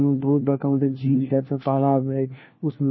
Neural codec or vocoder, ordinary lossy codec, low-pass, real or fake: codec, 16 kHz, 1 kbps, FunCodec, trained on LibriTTS, 50 frames a second; MP3, 24 kbps; 7.2 kHz; fake